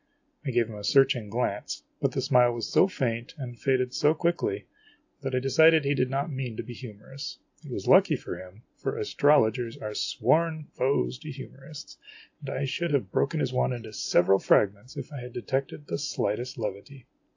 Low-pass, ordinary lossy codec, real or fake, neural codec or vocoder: 7.2 kHz; AAC, 48 kbps; real; none